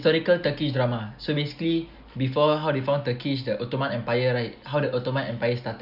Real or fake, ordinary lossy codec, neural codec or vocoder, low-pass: real; none; none; 5.4 kHz